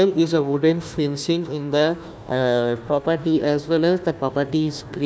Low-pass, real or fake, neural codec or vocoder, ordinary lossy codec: none; fake; codec, 16 kHz, 1 kbps, FunCodec, trained on Chinese and English, 50 frames a second; none